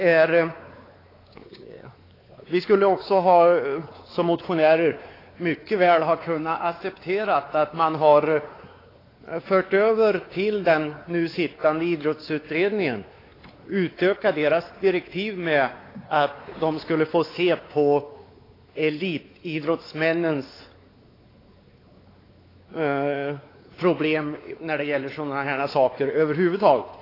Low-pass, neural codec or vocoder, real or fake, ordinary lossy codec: 5.4 kHz; codec, 16 kHz, 4 kbps, X-Codec, WavLM features, trained on Multilingual LibriSpeech; fake; AAC, 24 kbps